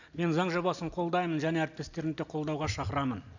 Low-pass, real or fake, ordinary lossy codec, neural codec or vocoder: 7.2 kHz; real; none; none